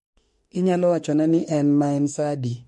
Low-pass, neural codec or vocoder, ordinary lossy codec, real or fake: 14.4 kHz; autoencoder, 48 kHz, 32 numbers a frame, DAC-VAE, trained on Japanese speech; MP3, 48 kbps; fake